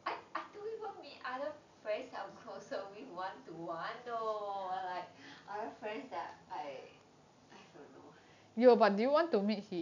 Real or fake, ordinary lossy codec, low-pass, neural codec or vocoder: real; none; 7.2 kHz; none